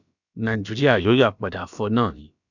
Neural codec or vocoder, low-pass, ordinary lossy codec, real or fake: codec, 16 kHz, about 1 kbps, DyCAST, with the encoder's durations; 7.2 kHz; none; fake